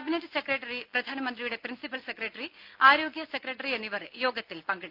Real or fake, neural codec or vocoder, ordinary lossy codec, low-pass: real; none; Opus, 32 kbps; 5.4 kHz